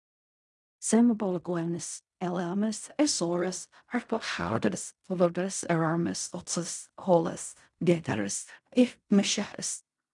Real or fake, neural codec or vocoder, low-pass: fake; codec, 16 kHz in and 24 kHz out, 0.4 kbps, LongCat-Audio-Codec, fine tuned four codebook decoder; 10.8 kHz